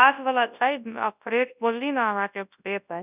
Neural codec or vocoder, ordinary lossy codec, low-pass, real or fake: codec, 24 kHz, 0.9 kbps, WavTokenizer, large speech release; none; 3.6 kHz; fake